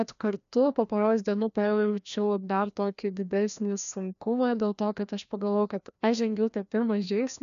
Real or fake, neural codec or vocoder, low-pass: fake; codec, 16 kHz, 1 kbps, FreqCodec, larger model; 7.2 kHz